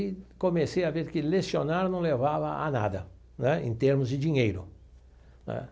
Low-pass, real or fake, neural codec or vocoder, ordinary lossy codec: none; real; none; none